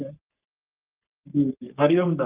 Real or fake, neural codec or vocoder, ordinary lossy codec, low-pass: fake; codec, 44.1 kHz, 3.4 kbps, Pupu-Codec; Opus, 24 kbps; 3.6 kHz